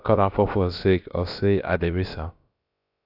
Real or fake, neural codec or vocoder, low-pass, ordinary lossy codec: fake; codec, 16 kHz, about 1 kbps, DyCAST, with the encoder's durations; 5.4 kHz; AAC, 48 kbps